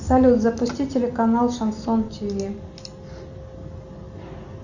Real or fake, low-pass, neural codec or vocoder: real; 7.2 kHz; none